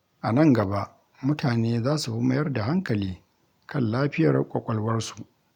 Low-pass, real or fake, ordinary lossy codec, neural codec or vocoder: 19.8 kHz; real; none; none